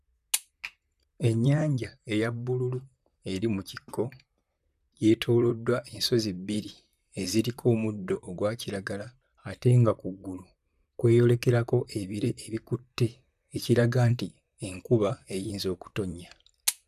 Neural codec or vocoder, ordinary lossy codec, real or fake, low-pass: vocoder, 44.1 kHz, 128 mel bands, Pupu-Vocoder; none; fake; 14.4 kHz